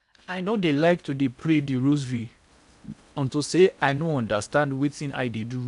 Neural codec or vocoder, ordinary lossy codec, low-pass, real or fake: codec, 16 kHz in and 24 kHz out, 0.8 kbps, FocalCodec, streaming, 65536 codes; none; 10.8 kHz; fake